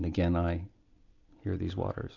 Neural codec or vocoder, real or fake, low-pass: none; real; 7.2 kHz